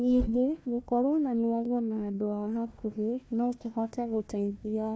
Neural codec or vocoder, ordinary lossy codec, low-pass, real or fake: codec, 16 kHz, 1 kbps, FunCodec, trained on Chinese and English, 50 frames a second; none; none; fake